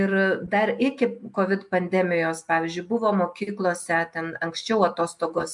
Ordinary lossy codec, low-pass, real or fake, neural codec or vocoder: MP3, 64 kbps; 10.8 kHz; real; none